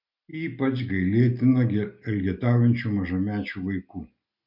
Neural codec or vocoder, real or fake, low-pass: none; real; 5.4 kHz